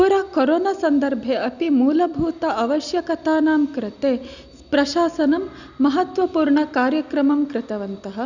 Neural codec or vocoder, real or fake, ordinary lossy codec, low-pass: none; real; none; 7.2 kHz